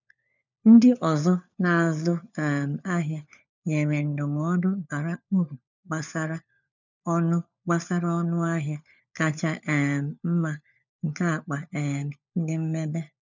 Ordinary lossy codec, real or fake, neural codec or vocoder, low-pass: none; fake; codec, 16 kHz, 4 kbps, FunCodec, trained on LibriTTS, 50 frames a second; 7.2 kHz